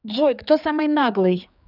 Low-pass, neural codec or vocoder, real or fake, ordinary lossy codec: 5.4 kHz; codec, 16 kHz, 4 kbps, X-Codec, HuBERT features, trained on general audio; fake; none